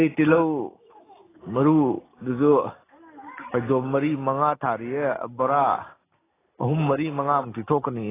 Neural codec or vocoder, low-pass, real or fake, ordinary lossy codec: none; 3.6 kHz; real; AAC, 16 kbps